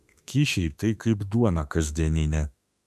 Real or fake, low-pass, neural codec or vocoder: fake; 14.4 kHz; autoencoder, 48 kHz, 32 numbers a frame, DAC-VAE, trained on Japanese speech